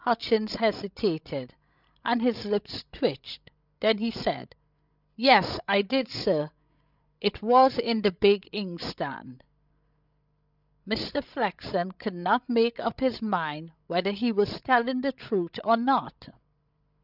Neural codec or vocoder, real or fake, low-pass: codec, 16 kHz, 16 kbps, FreqCodec, larger model; fake; 5.4 kHz